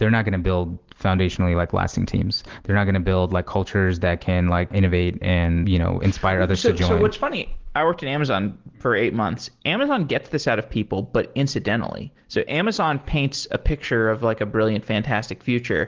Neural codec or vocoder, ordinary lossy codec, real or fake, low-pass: none; Opus, 16 kbps; real; 7.2 kHz